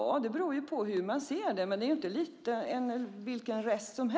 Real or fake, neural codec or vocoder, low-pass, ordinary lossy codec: real; none; none; none